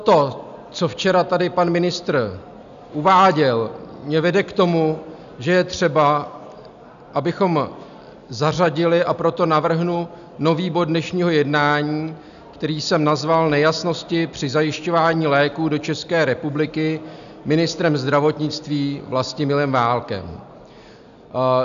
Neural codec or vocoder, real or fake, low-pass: none; real; 7.2 kHz